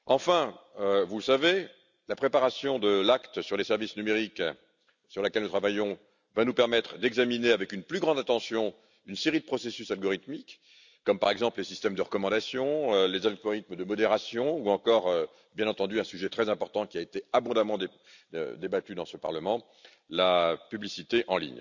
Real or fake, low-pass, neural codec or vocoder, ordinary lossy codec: real; 7.2 kHz; none; none